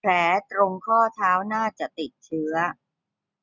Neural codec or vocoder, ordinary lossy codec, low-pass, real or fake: none; none; 7.2 kHz; real